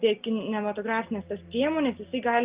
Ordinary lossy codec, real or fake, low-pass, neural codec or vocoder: Opus, 24 kbps; real; 3.6 kHz; none